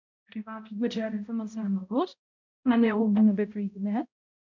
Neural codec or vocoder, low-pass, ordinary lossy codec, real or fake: codec, 16 kHz, 0.5 kbps, X-Codec, HuBERT features, trained on balanced general audio; 7.2 kHz; MP3, 64 kbps; fake